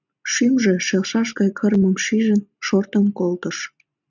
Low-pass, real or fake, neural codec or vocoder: 7.2 kHz; real; none